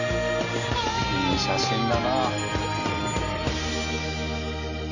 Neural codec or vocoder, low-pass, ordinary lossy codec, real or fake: none; 7.2 kHz; none; real